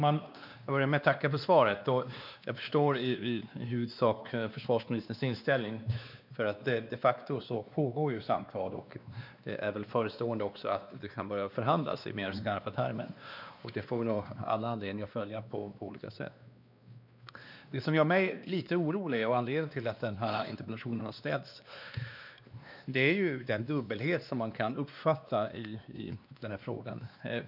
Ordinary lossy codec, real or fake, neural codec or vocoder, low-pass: none; fake; codec, 16 kHz, 2 kbps, X-Codec, WavLM features, trained on Multilingual LibriSpeech; 5.4 kHz